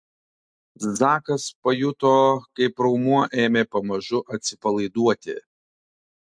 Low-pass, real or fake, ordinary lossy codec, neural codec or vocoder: 9.9 kHz; real; MP3, 64 kbps; none